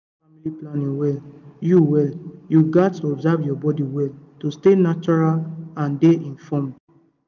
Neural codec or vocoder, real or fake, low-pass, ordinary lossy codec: none; real; 7.2 kHz; none